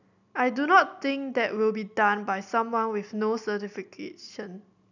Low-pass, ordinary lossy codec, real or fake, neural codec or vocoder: 7.2 kHz; none; real; none